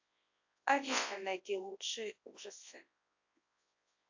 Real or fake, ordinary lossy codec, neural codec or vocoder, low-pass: fake; AAC, 48 kbps; codec, 24 kHz, 0.9 kbps, WavTokenizer, large speech release; 7.2 kHz